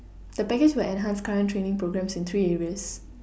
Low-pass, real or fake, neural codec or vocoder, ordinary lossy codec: none; real; none; none